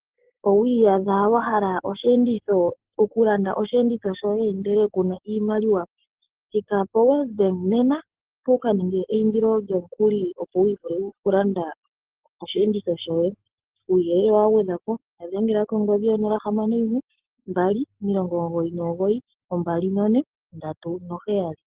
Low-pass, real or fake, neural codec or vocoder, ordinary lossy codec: 3.6 kHz; real; none; Opus, 16 kbps